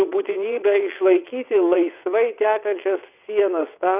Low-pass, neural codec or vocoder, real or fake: 3.6 kHz; vocoder, 22.05 kHz, 80 mel bands, WaveNeXt; fake